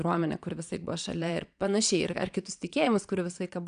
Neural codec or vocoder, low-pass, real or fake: vocoder, 22.05 kHz, 80 mel bands, Vocos; 9.9 kHz; fake